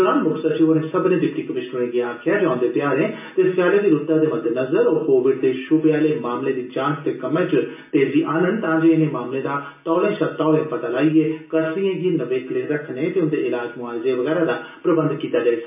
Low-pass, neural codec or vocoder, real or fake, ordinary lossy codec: 3.6 kHz; none; real; MP3, 32 kbps